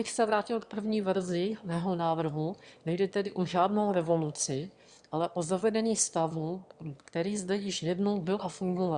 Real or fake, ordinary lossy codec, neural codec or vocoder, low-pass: fake; Opus, 64 kbps; autoencoder, 22.05 kHz, a latent of 192 numbers a frame, VITS, trained on one speaker; 9.9 kHz